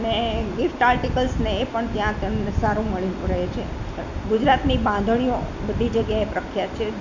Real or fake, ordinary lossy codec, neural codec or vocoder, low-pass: real; none; none; 7.2 kHz